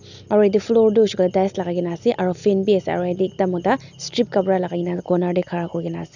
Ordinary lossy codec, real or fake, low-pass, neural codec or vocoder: none; real; 7.2 kHz; none